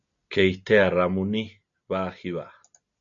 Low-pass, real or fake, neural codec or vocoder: 7.2 kHz; real; none